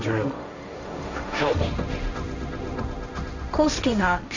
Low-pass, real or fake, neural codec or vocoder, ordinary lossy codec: 7.2 kHz; fake; codec, 16 kHz, 1.1 kbps, Voila-Tokenizer; none